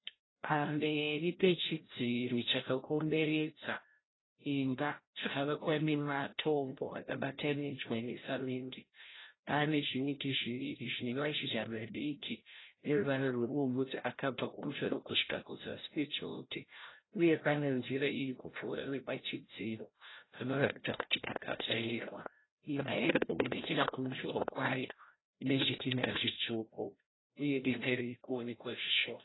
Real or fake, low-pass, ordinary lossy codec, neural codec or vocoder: fake; 7.2 kHz; AAC, 16 kbps; codec, 16 kHz, 0.5 kbps, FreqCodec, larger model